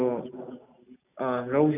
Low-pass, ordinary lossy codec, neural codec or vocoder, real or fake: 3.6 kHz; none; none; real